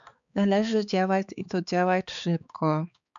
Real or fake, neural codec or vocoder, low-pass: fake; codec, 16 kHz, 4 kbps, X-Codec, HuBERT features, trained on balanced general audio; 7.2 kHz